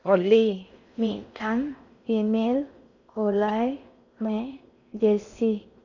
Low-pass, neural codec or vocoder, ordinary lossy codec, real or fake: 7.2 kHz; codec, 16 kHz in and 24 kHz out, 0.6 kbps, FocalCodec, streaming, 4096 codes; none; fake